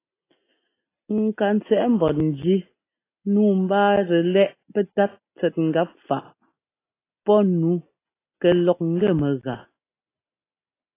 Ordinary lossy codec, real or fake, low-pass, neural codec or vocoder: AAC, 24 kbps; real; 3.6 kHz; none